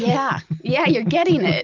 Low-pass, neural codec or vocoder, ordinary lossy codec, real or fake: 7.2 kHz; none; Opus, 32 kbps; real